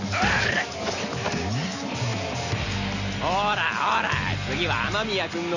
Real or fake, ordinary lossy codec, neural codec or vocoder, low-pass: real; none; none; 7.2 kHz